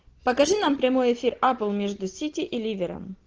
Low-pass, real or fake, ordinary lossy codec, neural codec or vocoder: 7.2 kHz; real; Opus, 16 kbps; none